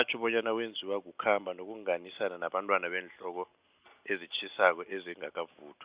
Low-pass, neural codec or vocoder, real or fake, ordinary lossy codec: 3.6 kHz; none; real; Opus, 64 kbps